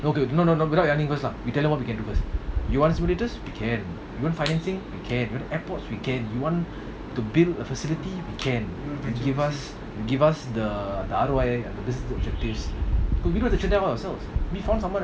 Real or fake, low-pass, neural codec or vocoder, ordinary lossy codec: real; none; none; none